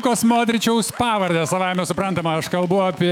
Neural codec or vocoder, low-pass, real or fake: autoencoder, 48 kHz, 128 numbers a frame, DAC-VAE, trained on Japanese speech; 19.8 kHz; fake